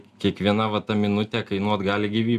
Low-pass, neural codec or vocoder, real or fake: 14.4 kHz; none; real